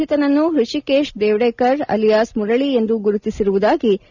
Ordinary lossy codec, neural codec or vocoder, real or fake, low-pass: none; none; real; 7.2 kHz